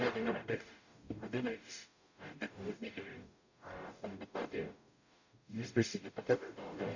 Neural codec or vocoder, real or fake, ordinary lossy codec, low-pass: codec, 44.1 kHz, 0.9 kbps, DAC; fake; none; 7.2 kHz